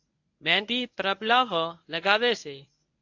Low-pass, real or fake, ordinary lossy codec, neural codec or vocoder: 7.2 kHz; fake; AAC, 48 kbps; codec, 24 kHz, 0.9 kbps, WavTokenizer, medium speech release version 1